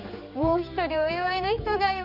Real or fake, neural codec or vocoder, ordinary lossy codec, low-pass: fake; codec, 16 kHz, 4 kbps, X-Codec, HuBERT features, trained on general audio; none; 5.4 kHz